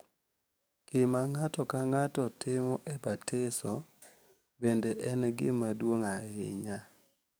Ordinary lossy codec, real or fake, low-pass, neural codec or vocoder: none; fake; none; codec, 44.1 kHz, 7.8 kbps, DAC